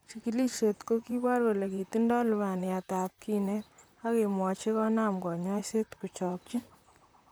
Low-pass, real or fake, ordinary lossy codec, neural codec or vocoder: none; fake; none; vocoder, 44.1 kHz, 128 mel bands, Pupu-Vocoder